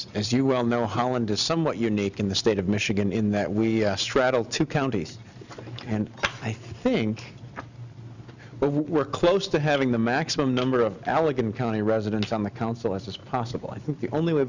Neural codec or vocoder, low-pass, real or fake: none; 7.2 kHz; real